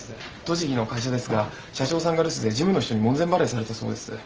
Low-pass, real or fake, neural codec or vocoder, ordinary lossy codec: 7.2 kHz; real; none; Opus, 24 kbps